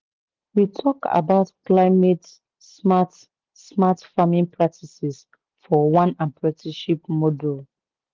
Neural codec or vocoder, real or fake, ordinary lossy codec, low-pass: none; real; Opus, 16 kbps; 7.2 kHz